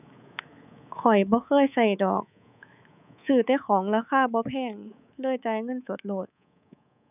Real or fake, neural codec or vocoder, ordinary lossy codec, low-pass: fake; codec, 24 kHz, 3.1 kbps, DualCodec; none; 3.6 kHz